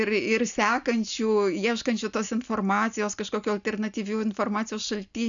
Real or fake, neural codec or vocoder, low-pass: real; none; 7.2 kHz